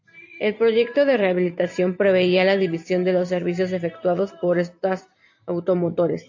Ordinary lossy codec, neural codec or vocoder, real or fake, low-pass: AAC, 48 kbps; vocoder, 44.1 kHz, 128 mel bands every 256 samples, BigVGAN v2; fake; 7.2 kHz